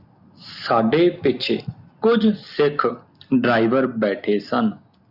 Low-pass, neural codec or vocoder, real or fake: 5.4 kHz; none; real